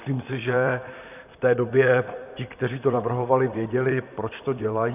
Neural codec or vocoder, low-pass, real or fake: vocoder, 44.1 kHz, 128 mel bands, Pupu-Vocoder; 3.6 kHz; fake